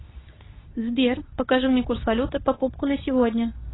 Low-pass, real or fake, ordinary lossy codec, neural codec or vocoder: 7.2 kHz; fake; AAC, 16 kbps; codec, 24 kHz, 0.9 kbps, WavTokenizer, medium speech release version 2